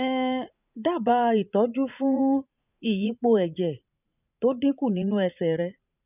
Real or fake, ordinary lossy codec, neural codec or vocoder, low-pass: fake; none; vocoder, 44.1 kHz, 80 mel bands, Vocos; 3.6 kHz